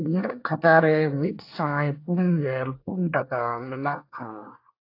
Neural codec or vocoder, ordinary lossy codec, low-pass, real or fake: codec, 24 kHz, 1 kbps, SNAC; AAC, 32 kbps; 5.4 kHz; fake